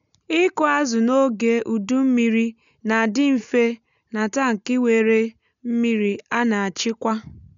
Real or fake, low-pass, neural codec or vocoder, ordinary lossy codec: real; 7.2 kHz; none; none